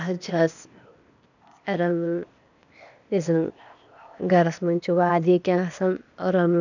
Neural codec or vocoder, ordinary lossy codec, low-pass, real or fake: codec, 16 kHz, 0.8 kbps, ZipCodec; none; 7.2 kHz; fake